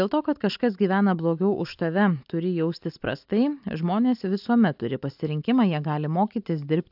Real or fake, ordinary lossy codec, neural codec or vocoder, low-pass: real; AAC, 48 kbps; none; 5.4 kHz